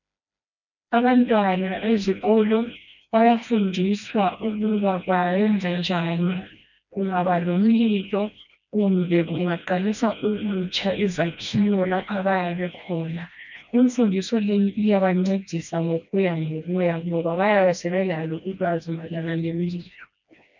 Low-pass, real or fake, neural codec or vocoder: 7.2 kHz; fake; codec, 16 kHz, 1 kbps, FreqCodec, smaller model